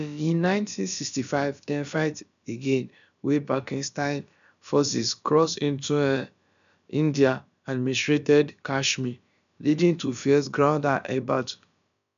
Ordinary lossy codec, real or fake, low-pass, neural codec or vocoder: none; fake; 7.2 kHz; codec, 16 kHz, about 1 kbps, DyCAST, with the encoder's durations